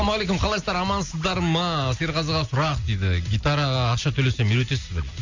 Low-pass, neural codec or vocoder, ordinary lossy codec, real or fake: 7.2 kHz; none; Opus, 64 kbps; real